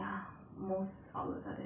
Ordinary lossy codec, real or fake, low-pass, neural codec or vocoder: none; real; 3.6 kHz; none